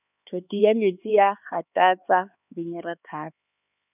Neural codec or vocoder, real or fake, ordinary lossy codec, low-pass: codec, 16 kHz, 4 kbps, X-Codec, HuBERT features, trained on LibriSpeech; fake; none; 3.6 kHz